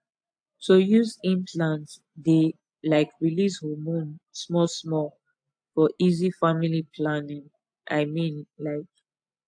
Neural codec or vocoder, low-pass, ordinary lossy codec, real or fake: none; 9.9 kHz; AAC, 64 kbps; real